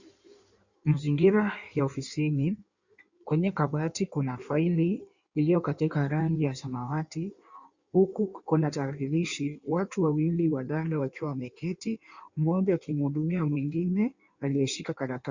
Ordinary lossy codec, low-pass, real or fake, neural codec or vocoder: Opus, 64 kbps; 7.2 kHz; fake; codec, 16 kHz in and 24 kHz out, 1.1 kbps, FireRedTTS-2 codec